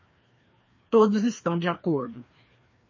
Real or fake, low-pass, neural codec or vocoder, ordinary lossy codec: fake; 7.2 kHz; codec, 16 kHz, 2 kbps, FreqCodec, larger model; MP3, 32 kbps